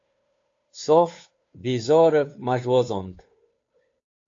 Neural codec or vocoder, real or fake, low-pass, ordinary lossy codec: codec, 16 kHz, 2 kbps, FunCodec, trained on Chinese and English, 25 frames a second; fake; 7.2 kHz; AAC, 48 kbps